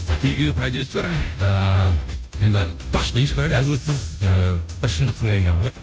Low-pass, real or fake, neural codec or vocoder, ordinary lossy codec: none; fake; codec, 16 kHz, 0.5 kbps, FunCodec, trained on Chinese and English, 25 frames a second; none